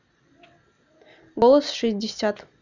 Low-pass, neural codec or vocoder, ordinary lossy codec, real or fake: 7.2 kHz; none; none; real